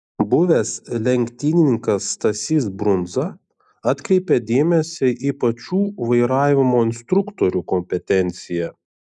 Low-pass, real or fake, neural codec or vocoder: 10.8 kHz; real; none